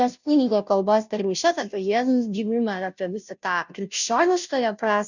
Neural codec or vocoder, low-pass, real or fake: codec, 16 kHz, 0.5 kbps, FunCodec, trained on Chinese and English, 25 frames a second; 7.2 kHz; fake